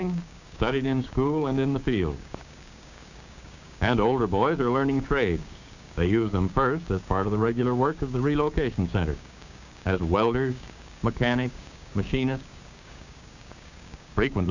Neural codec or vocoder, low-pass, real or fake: codec, 16 kHz, 6 kbps, DAC; 7.2 kHz; fake